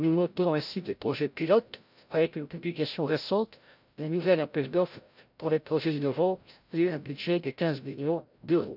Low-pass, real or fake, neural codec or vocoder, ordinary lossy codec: 5.4 kHz; fake; codec, 16 kHz, 0.5 kbps, FreqCodec, larger model; none